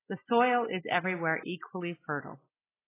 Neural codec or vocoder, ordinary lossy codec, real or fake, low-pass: none; AAC, 16 kbps; real; 3.6 kHz